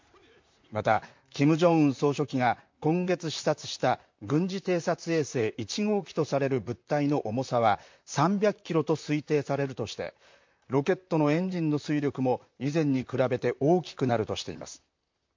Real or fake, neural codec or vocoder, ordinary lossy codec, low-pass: fake; vocoder, 44.1 kHz, 128 mel bands, Pupu-Vocoder; MP3, 48 kbps; 7.2 kHz